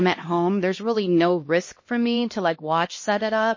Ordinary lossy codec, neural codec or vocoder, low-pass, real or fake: MP3, 32 kbps; codec, 16 kHz, 1 kbps, X-Codec, HuBERT features, trained on LibriSpeech; 7.2 kHz; fake